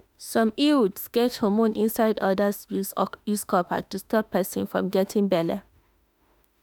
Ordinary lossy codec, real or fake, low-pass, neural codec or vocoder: none; fake; none; autoencoder, 48 kHz, 32 numbers a frame, DAC-VAE, trained on Japanese speech